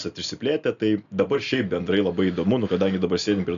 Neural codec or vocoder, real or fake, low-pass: none; real; 7.2 kHz